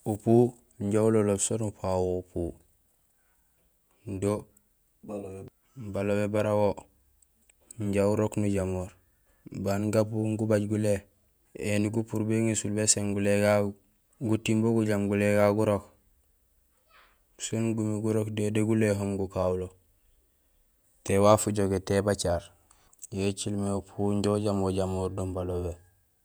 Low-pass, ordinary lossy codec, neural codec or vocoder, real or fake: none; none; vocoder, 48 kHz, 128 mel bands, Vocos; fake